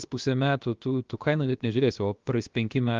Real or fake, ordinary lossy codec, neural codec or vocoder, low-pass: fake; Opus, 32 kbps; codec, 16 kHz, 0.7 kbps, FocalCodec; 7.2 kHz